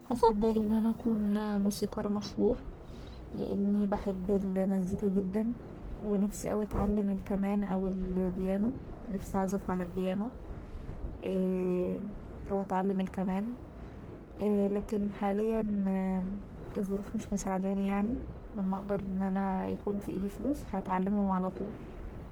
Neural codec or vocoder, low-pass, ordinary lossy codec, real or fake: codec, 44.1 kHz, 1.7 kbps, Pupu-Codec; none; none; fake